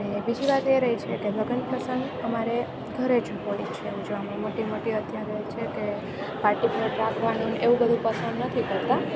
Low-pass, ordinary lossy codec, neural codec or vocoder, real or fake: none; none; none; real